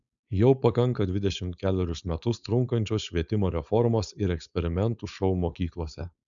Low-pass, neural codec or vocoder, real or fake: 7.2 kHz; codec, 16 kHz, 4.8 kbps, FACodec; fake